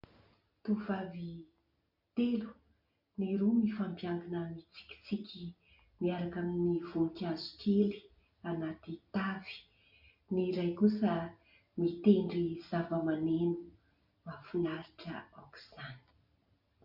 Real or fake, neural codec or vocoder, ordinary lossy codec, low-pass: real; none; MP3, 48 kbps; 5.4 kHz